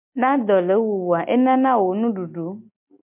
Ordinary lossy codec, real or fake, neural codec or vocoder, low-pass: MP3, 32 kbps; real; none; 3.6 kHz